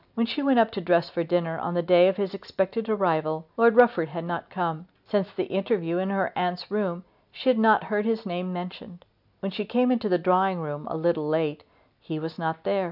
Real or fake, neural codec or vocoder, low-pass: real; none; 5.4 kHz